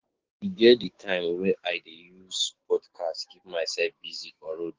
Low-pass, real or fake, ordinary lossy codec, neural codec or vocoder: 7.2 kHz; fake; Opus, 16 kbps; codec, 16 kHz, 6 kbps, DAC